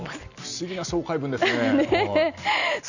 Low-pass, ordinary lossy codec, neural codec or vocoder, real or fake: 7.2 kHz; none; none; real